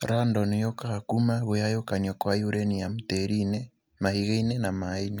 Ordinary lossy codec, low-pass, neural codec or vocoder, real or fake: none; none; none; real